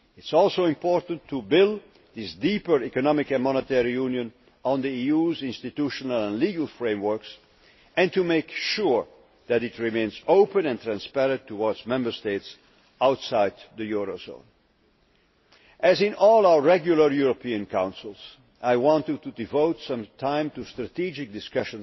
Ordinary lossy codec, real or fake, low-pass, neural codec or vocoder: MP3, 24 kbps; real; 7.2 kHz; none